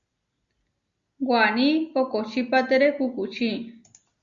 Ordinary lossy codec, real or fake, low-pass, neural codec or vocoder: Opus, 64 kbps; real; 7.2 kHz; none